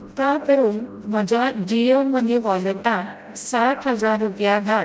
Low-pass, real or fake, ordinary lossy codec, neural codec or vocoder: none; fake; none; codec, 16 kHz, 0.5 kbps, FreqCodec, smaller model